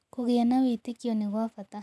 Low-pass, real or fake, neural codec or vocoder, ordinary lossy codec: none; real; none; none